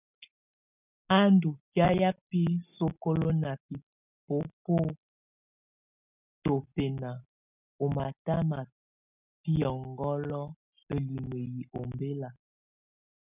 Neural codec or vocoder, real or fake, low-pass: none; real; 3.6 kHz